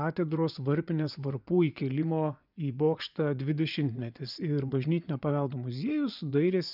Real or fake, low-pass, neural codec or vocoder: fake; 5.4 kHz; vocoder, 24 kHz, 100 mel bands, Vocos